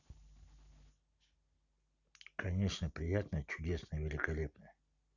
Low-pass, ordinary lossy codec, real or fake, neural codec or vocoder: 7.2 kHz; none; real; none